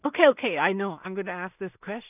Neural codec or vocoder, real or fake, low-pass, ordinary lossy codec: codec, 16 kHz in and 24 kHz out, 0.4 kbps, LongCat-Audio-Codec, two codebook decoder; fake; 3.6 kHz; none